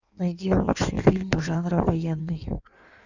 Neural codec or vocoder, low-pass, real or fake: codec, 16 kHz in and 24 kHz out, 1.1 kbps, FireRedTTS-2 codec; 7.2 kHz; fake